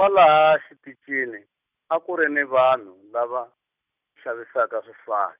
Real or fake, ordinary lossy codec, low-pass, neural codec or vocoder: real; none; 3.6 kHz; none